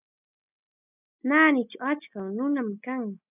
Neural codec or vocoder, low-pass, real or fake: none; 3.6 kHz; real